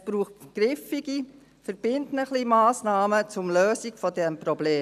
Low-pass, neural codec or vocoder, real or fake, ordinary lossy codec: 14.4 kHz; none; real; none